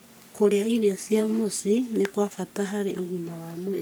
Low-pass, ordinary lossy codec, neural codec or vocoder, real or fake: none; none; codec, 44.1 kHz, 3.4 kbps, Pupu-Codec; fake